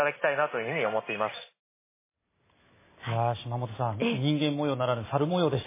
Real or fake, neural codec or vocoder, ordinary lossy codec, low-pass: real; none; MP3, 16 kbps; 3.6 kHz